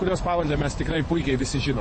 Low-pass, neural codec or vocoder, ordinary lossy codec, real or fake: 9.9 kHz; vocoder, 24 kHz, 100 mel bands, Vocos; MP3, 32 kbps; fake